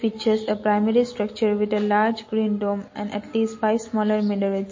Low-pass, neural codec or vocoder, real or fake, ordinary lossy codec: 7.2 kHz; none; real; MP3, 32 kbps